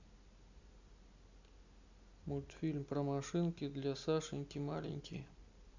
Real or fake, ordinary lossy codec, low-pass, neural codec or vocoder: real; Opus, 64 kbps; 7.2 kHz; none